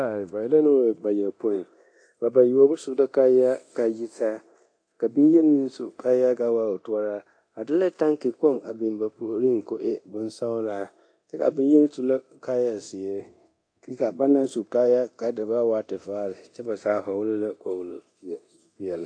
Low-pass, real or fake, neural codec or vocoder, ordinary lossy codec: 9.9 kHz; fake; codec, 24 kHz, 0.9 kbps, DualCodec; AAC, 48 kbps